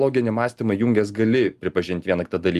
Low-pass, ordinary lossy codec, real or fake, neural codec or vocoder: 14.4 kHz; Opus, 32 kbps; real; none